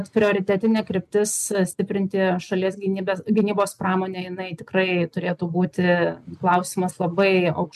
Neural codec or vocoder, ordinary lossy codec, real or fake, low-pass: none; MP3, 96 kbps; real; 14.4 kHz